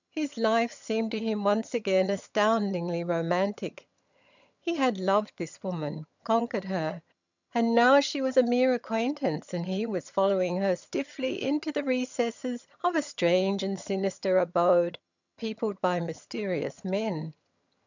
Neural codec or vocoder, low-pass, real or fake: vocoder, 22.05 kHz, 80 mel bands, HiFi-GAN; 7.2 kHz; fake